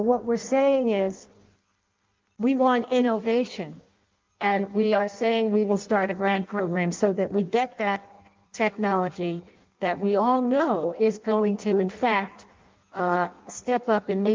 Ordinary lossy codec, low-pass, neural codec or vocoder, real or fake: Opus, 24 kbps; 7.2 kHz; codec, 16 kHz in and 24 kHz out, 0.6 kbps, FireRedTTS-2 codec; fake